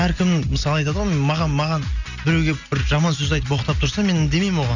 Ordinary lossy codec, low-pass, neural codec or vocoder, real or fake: none; 7.2 kHz; none; real